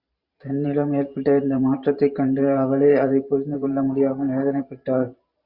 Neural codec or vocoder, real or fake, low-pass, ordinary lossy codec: vocoder, 44.1 kHz, 128 mel bands every 512 samples, BigVGAN v2; fake; 5.4 kHz; Opus, 64 kbps